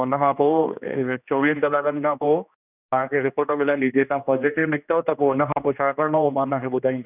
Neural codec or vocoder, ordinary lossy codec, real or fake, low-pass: codec, 16 kHz, 2 kbps, X-Codec, HuBERT features, trained on general audio; none; fake; 3.6 kHz